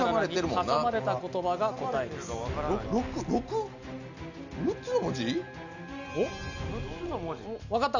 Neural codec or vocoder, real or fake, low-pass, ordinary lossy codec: none; real; 7.2 kHz; none